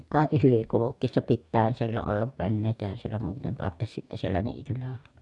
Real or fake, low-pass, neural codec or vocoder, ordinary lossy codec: fake; 10.8 kHz; codec, 44.1 kHz, 2.6 kbps, DAC; none